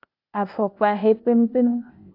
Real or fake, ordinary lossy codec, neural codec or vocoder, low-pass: fake; AAC, 32 kbps; codec, 16 kHz, 0.8 kbps, ZipCodec; 5.4 kHz